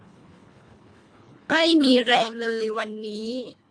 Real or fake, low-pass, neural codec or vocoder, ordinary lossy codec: fake; 9.9 kHz; codec, 24 kHz, 1.5 kbps, HILCodec; AAC, 48 kbps